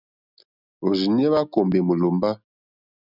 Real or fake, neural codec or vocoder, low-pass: real; none; 5.4 kHz